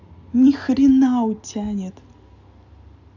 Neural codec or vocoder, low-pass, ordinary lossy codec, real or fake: none; 7.2 kHz; none; real